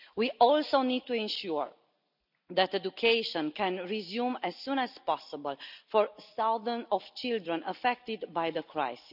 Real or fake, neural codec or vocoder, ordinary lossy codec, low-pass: real; none; none; 5.4 kHz